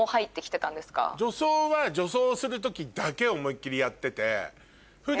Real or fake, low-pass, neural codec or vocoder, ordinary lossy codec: real; none; none; none